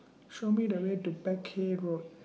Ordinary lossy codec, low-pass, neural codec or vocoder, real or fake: none; none; none; real